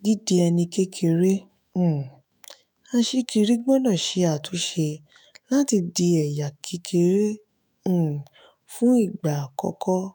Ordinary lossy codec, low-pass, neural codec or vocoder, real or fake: none; none; autoencoder, 48 kHz, 128 numbers a frame, DAC-VAE, trained on Japanese speech; fake